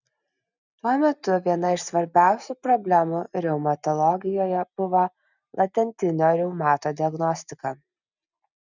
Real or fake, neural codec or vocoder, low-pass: real; none; 7.2 kHz